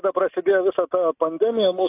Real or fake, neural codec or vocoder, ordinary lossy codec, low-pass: real; none; AAC, 24 kbps; 3.6 kHz